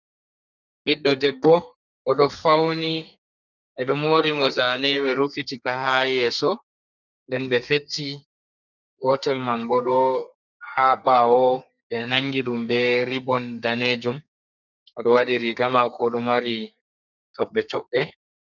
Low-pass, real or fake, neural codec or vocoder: 7.2 kHz; fake; codec, 32 kHz, 1.9 kbps, SNAC